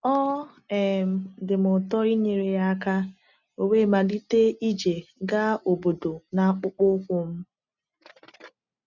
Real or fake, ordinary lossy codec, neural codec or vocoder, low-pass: real; none; none; 7.2 kHz